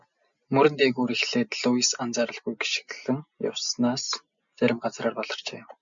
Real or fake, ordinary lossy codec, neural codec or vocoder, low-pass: real; MP3, 64 kbps; none; 7.2 kHz